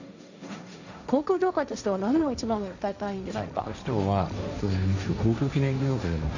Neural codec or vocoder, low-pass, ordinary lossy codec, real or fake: codec, 16 kHz, 1.1 kbps, Voila-Tokenizer; 7.2 kHz; none; fake